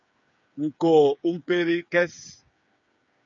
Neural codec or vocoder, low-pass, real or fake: codec, 16 kHz, 8 kbps, FreqCodec, smaller model; 7.2 kHz; fake